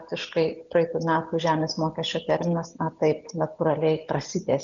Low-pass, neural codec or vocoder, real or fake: 7.2 kHz; none; real